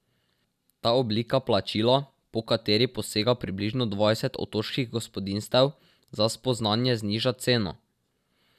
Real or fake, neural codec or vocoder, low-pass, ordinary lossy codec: real; none; 14.4 kHz; none